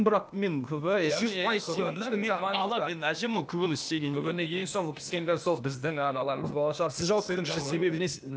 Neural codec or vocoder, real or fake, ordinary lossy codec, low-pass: codec, 16 kHz, 0.8 kbps, ZipCodec; fake; none; none